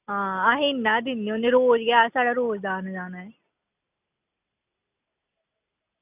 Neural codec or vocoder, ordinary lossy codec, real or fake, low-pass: none; none; real; 3.6 kHz